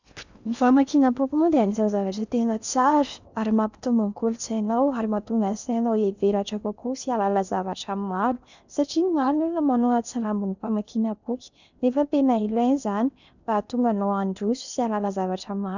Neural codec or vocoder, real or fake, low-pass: codec, 16 kHz in and 24 kHz out, 0.8 kbps, FocalCodec, streaming, 65536 codes; fake; 7.2 kHz